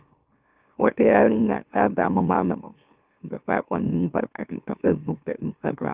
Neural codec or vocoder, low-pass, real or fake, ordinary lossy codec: autoencoder, 44.1 kHz, a latent of 192 numbers a frame, MeloTTS; 3.6 kHz; fake; Opus, 32 kbps